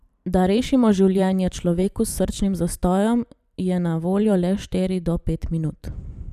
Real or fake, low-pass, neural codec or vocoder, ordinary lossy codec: fake; 14.4 kHz; vocoder, 44.1 kHz, 128 mel bands every 512 samples, BigVGAN v2; none